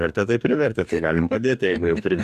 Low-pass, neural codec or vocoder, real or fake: 14.4 kHz; codec, 44.1 kHz, 2.6 kbps, DAC; fake